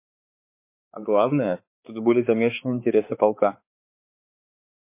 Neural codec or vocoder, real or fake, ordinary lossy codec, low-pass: codec, 16 kHz, 4 kbps, X-Codec, HuBERT features, trained on balanced general audio; fake; MP3, 24 kbps; 3.6 kHz